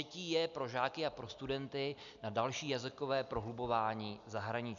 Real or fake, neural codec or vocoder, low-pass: real; none; 7.2 kHz